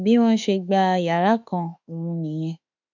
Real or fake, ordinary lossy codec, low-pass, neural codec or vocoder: fake; none; 7.2 kHz; autoencoder, 48 kHz, 32 numbers a frame, DAC-VAE, trained on Japanese speech